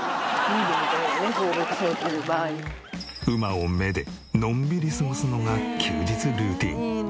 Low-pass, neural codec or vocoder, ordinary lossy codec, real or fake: none; none; none; real